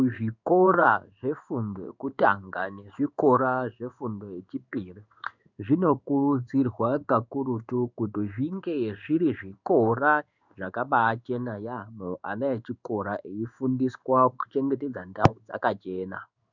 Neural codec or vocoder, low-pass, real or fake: codec, 16 kHz in and 24 kHz out, 1 kbps, XY-Tokenizer; 7.2 kHz; fake